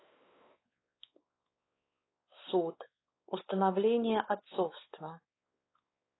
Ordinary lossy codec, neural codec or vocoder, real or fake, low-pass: AAC, 16 kbps; codec, 16 kHz, 4 kbps, X-Codec, WavLM features, trained on Multilingual LibriSpeech; fake; 7.2 kHz